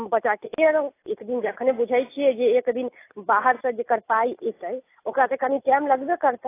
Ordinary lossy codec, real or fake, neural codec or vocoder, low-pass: AAC, 24 kbps; real; none; 3.6 kHz